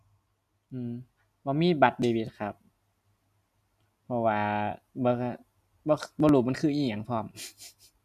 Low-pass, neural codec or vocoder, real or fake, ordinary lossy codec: 14.4 kHz; none; real; none